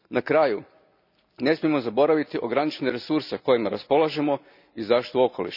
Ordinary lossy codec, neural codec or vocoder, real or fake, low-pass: none; none; real; 5.4 kHz